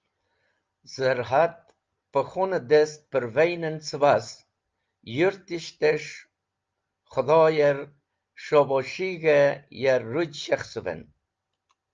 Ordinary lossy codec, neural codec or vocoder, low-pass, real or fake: Opus, 24 kbps; none; 7.2 kHz; real